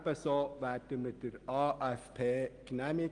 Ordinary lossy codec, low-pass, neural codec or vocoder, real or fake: Opus, 24 kbps; 9.9 kHz; none; real